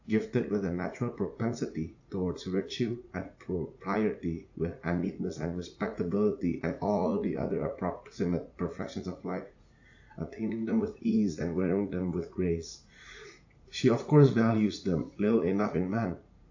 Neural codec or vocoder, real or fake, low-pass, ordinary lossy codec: vocoder, 44.1 kHz, 80 mel bands, Vocos; fake; 7.2 kHz; AAC, 48 kbps